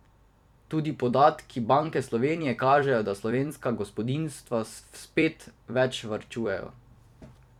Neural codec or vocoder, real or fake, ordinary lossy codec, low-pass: vocoder, 44.1 kHz, 128 mel bands every 256 samples, BigVGAN v2; fake; none; 19.8 kHz